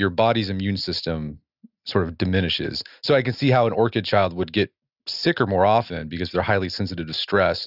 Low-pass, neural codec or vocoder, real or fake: 5.4 kHz; none; real